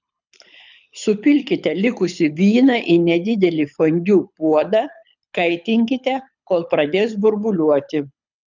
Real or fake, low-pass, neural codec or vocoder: fake; 7.2 kHz; codec, 24 kHz, 6 kbps, HILCodec